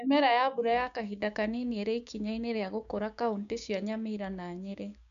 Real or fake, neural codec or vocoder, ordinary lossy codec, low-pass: fake; codec, 16 kHz, 6 kbps, DAC; none; 7.2 kHz